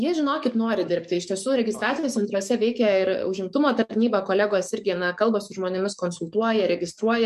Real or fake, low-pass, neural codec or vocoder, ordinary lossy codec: fake; 14.4 kHz; codec, 44.1 kHz, 7.8 kbps, DAC; MP3, 64 kbps